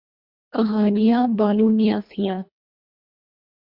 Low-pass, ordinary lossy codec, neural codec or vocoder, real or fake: 5.4 kHz; Opus, 64 kbps; codec, 24 kHz, 1.5 kbps, HILCodec; fake